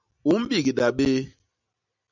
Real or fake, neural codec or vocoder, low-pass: real; none; 7.2 kHz